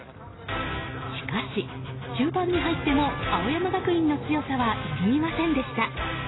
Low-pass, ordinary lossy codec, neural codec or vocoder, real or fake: 7.2 kHz; AAC, 16 kbps; none; real